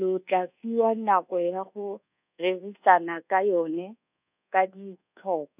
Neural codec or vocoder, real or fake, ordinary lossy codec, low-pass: codec, 24 kHz, 1.2 kbps, DualCodec; fake; none; 3.6 kHz